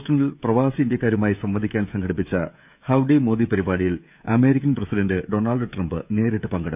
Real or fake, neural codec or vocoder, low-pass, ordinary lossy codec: fake; codec, 16 kHz, 16 kbps, FreqCodec, smaller model; 3.6 kHz; none